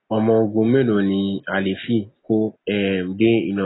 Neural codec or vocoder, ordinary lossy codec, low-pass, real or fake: none; AAC, 16 kbps; 7.2 kHz; real